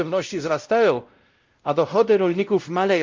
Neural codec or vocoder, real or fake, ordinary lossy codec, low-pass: codec, 16 kHz, 0.5 kbps, X-Codec, WavLM features, trained on Multilingual LibriSpeech; fake; Opus, 32 kbps; 7.2 kHz